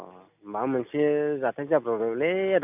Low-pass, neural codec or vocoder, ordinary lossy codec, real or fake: 3.6 kHz; none; none; real